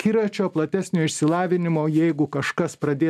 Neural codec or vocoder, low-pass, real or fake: none; 14.4 kHz; real